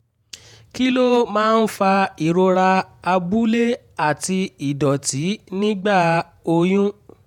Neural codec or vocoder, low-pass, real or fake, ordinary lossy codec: vocoder, 48 kHz, 128 mel bands, Vocos; 19.8 kHz; fake; none